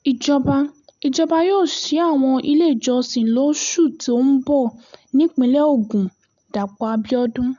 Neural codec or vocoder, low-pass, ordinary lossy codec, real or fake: none; 7.2 kHz; none; real